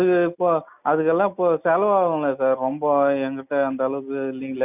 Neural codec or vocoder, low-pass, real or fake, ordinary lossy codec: none; 3.6 kHz; real; none